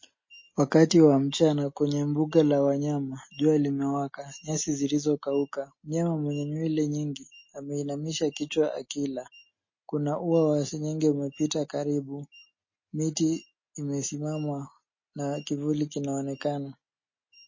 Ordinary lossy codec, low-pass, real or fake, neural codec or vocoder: MP3, 32 kbps; 7.2 kHz; real; none